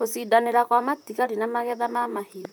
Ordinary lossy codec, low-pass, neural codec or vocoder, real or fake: none; none; vocoder, 44.1 kHz, 128 mel bands, Pupu-Vocoder; fake